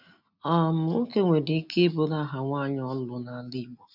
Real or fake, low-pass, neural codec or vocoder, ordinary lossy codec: real; 5.4 kHz; none; none